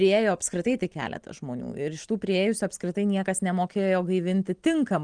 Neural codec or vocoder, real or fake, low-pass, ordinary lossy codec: none; real; 9.9 kHz; Opus, 24 kbps